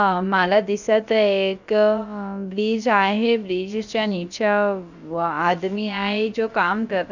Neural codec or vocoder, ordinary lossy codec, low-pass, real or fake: codec, 16 kHz, about 1 kbps, DyCAST, with the encoder's durations; none; 7.2 kHz; fake